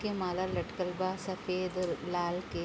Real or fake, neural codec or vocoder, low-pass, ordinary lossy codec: real; none; none; none